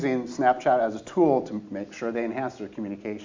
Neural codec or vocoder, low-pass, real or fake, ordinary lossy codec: none; 7.2 kHz; real; MP3, 64 kbps